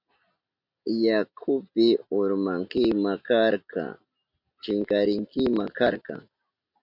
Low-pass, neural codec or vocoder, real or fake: 5.4 kHz; none; real